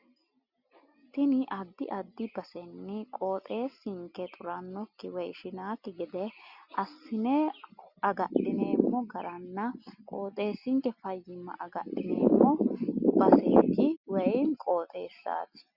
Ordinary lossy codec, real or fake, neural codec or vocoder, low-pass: Opus, 64 kbps; real; none; 5.4 kHz